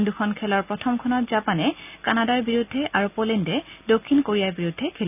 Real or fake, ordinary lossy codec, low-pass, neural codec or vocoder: real; none; 3.6 kHz; none